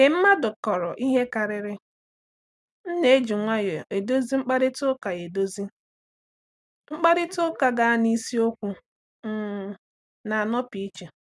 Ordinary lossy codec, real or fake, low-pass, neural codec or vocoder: none; real; none; none